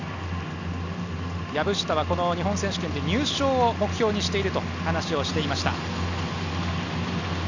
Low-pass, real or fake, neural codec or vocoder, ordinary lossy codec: 7.2 kHz; real; none; none